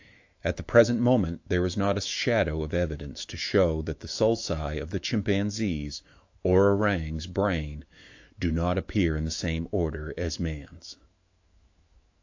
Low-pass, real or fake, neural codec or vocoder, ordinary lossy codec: 7.2 kHz; fake; autoencoder, 48 kHz, 128 numbers a frame, DAC-VAE, trained on Japanese speech; AAC, 48 kbps